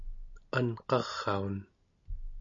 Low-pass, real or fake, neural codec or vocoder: 7.2 kHz; real; none